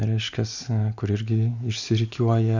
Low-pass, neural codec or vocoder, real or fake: 7.2 kHz; none; real